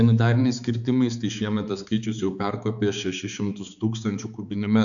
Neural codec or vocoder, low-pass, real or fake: codec, 16 kHz, 4 kbps, X-Codec, HuBERT features, trained on balanced general audio; 7.2 kHz; fake